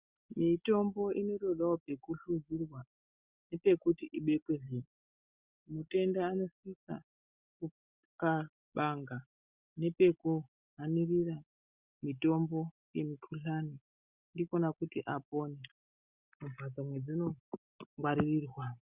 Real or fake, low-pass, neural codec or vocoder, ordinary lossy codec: real; 3.6 kHz; none; Opus, 64 kbps